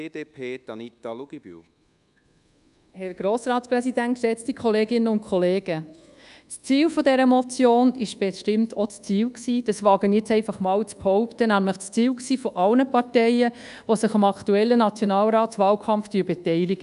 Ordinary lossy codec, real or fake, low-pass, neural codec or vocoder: none; fake; 10.8 kHz; codec, 24 kHz, 1.2 kbps, DualCodec